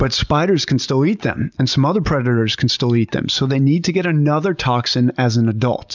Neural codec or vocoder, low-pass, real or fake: none; 7.2 kHz; real